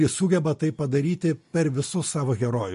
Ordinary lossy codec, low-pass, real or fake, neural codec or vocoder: MP3, 48 kbps; 10.8 kHz; real; none